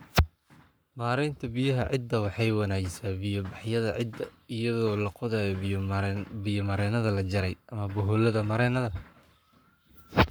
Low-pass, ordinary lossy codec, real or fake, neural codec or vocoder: none; none; fake; codec, 44.1 kHz, 7.8 kbps, Pupu-Codec